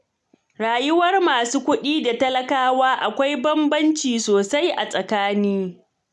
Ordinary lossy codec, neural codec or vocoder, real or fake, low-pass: none; none; real; none